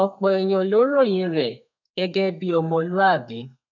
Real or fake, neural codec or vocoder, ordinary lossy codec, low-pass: fake; codec, 32 kHz, 1.9 kbps, SNAC; none; 7.2 kHz